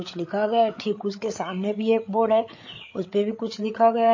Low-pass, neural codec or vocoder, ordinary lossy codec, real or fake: 7.2 kHz; codec, 16 kHz, 8 kbps, FreqCodec, larger model; MP3, 32 kbps; fake